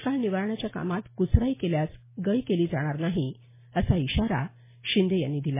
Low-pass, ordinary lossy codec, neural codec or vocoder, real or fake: 3.6 kHz; MP3, 16 kbps; none; real